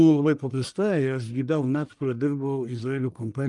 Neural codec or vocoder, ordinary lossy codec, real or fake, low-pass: codec, 44.1 kHz, 1.7 kbps, Pupu-Codec; Opus, 32 kbps; fake; 10.8 kHz